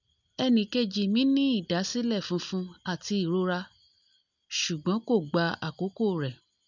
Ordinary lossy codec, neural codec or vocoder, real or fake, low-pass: none; none; real; 7.2 kHz